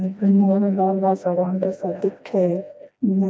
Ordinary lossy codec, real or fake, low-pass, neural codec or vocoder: none; fake; none; codec, 16 kHz, 1 kbps, FreqCodec, smaller model